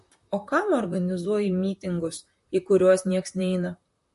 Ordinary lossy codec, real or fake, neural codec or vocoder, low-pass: MP3, 48 kbps; fake; vocoder, 44.1 kHz, 128 mel bands, Pupu-Vocoder; 14.4 kHz